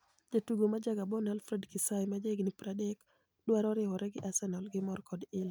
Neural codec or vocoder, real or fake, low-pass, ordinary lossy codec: none; real; none; none